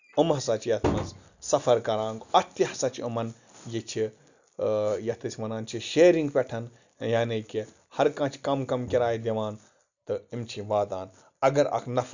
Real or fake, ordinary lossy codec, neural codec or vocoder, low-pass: real; none; none; 7.2 kHz